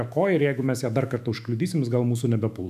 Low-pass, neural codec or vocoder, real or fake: 14.4 kHz; autoencoder, 48 kHz, 128 numbers a frame, DAC-VAE, trained on Japanese speech; fake